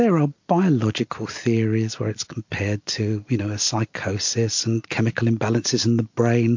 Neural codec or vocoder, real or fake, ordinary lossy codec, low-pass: none; real; MP3, 48 kbps; 7.2 kHz